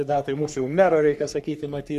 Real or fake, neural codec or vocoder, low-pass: fake; codec, 44.1 kHz, 3.4 kbps, Pupu-Codec; 14.4 kHz